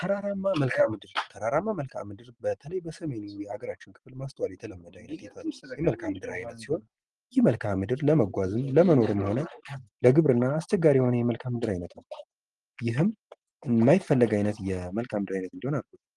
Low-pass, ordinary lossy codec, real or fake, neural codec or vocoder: 10.8 kHz; Opus, 24 kbps; real; none